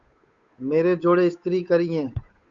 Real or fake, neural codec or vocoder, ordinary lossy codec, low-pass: fake; codec, 16 kHz, 8 kbps, FunCodec, trained on Chinese and English, 25 frames a second; Opus, 64 kbps; 7.2 kHz